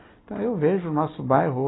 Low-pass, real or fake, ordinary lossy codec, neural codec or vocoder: 7.2 kHz; real; AAC, 16 kbps; none